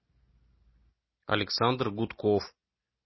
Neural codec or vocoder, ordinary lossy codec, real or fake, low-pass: none; MP3, 24 kbps; real; 7.2 kHz